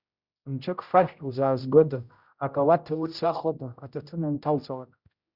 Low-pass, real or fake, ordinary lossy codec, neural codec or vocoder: 5.4 kHz; fake; Opus, 64 kbps; codec, 16 kHz, 0.5 kbps, X-Codec, HuBERT features, trained on general audio